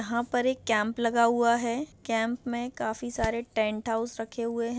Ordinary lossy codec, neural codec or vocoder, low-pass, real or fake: none; none; none; real